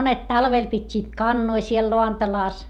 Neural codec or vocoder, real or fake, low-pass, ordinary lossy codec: vocoder, 48 kHz, 128 mel bands, Vocos; fake; 19.8 kHz; none